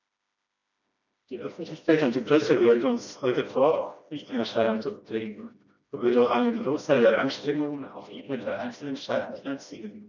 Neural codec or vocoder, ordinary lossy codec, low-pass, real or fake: codec, 16 kHz, 1 kbps, FreqCodec, smaller model; none; 7.2 kHz; fake